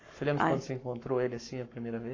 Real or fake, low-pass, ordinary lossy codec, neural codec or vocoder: fake; 7.2 kHz; AAC, 32 kbps; codec, 44.1 kHz, 7.8 kbps, Pupu-Codec